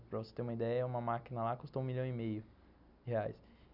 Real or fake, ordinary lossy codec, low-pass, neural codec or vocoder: real; none; 5.4 kHz; none